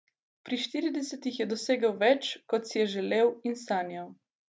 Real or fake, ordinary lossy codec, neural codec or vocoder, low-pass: real; none; none; none